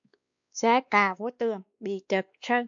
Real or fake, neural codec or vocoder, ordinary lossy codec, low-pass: fake; codec, 16 kHz, 2 kbps, X-Codec, WavLM features, trained on Multilingual LibriSpeech; AAC, 64 kbps; 7.2 kHz